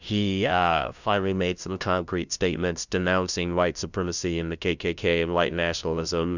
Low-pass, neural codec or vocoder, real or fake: 7.2 kHz; codec, 16 kHz, 0.5 kbps, FunCodec, trained on LibriTTS, 25 frames a second; fake